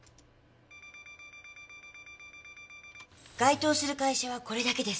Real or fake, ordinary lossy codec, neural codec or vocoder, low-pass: real; none; none; none